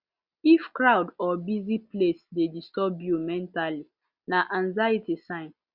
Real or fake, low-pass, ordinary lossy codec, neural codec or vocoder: real; 5.4 kHz; Opus, 64 kbps; none